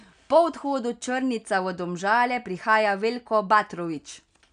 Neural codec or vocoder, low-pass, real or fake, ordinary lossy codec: none; 9.9 kHz; real; none